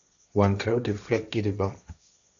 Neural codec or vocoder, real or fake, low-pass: codec, 16 kHz, 1.1 kbps, Voila-Tokenizer; fake; 7.2 kHz